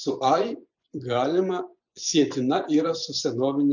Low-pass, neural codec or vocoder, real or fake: 7.2 kHz; vocoder, 24 kHz, 100 mel bands, Vocos; fake